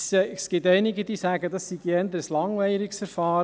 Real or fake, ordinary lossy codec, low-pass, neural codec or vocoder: real; none; none; none